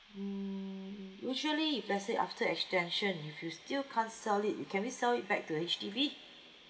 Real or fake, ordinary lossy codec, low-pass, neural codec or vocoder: real; none; none; none